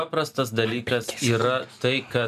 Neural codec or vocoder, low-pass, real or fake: none; 14.4 kHz; real